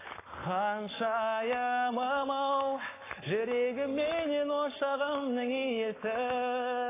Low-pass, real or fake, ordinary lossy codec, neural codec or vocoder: 3.6 kHz; fake; AAC, 16 kbps; codec, 44.1 kHz, 7.8 kbps, Pupu-Codec